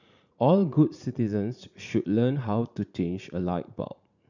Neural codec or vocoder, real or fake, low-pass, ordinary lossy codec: vocoder, 44.1 kHz, 80 mel bands, Vocos; fake; 7.2 kHz; none